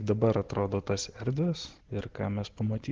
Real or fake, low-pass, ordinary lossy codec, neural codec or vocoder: real; 7.2 kHz; Opus, 16 kbps; none